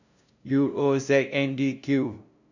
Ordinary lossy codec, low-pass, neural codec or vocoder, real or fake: none; 7.2 kHz; codec, 16 kHz, 0.5 kbps, FunCodec, trained on LibriTTS, 25 frames a second; fake